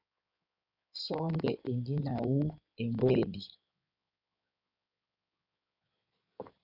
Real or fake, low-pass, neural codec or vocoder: fake; 5.4 kHz; codec, 16 kHz in and 24 kHz out, 2.2 kbps, FireRedTTS-2 codec